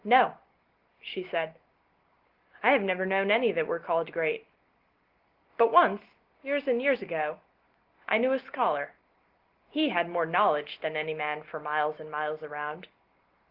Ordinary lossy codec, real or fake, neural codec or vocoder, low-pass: Opus, 16 kbps; real; none; 5.4 kHz